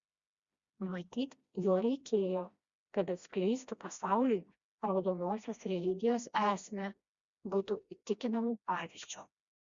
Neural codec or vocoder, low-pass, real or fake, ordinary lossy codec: codec, 16 kHz, 1 kbps, FreqCodec, smaller model; 7.2 kHz; fake; Opus, 64 kbps